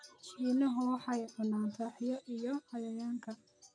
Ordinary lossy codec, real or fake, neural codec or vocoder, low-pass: none; real; none; 9.9 kHz